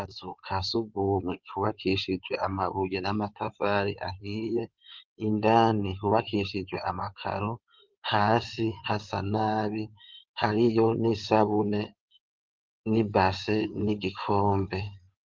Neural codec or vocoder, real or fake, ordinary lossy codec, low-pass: codec, 16 kHz in and 24 kHz out, 2.2 kbps, FireRedTTS-2 codec; fake; Opus, 32 kbps; 7.2 kHz